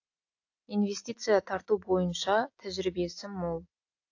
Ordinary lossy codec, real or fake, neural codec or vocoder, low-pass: AAC, 48 kbps; real; none; 7.2 kHz